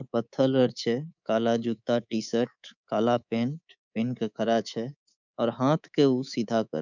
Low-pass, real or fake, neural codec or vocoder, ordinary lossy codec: 7.2 kHz; fake; codec, 24 kHz, 3.1 kbps, DualCodec; none